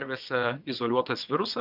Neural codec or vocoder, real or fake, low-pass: vocoder, 22.05 kHz, 80 mel bands, WaveNeXt; fake; 5.4 kHz